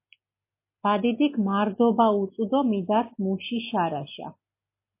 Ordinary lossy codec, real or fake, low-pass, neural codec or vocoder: MP3, 24 kbps; real; 3.6 kHz; none